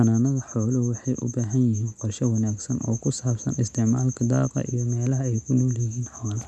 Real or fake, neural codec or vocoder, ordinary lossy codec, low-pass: real; none; none; none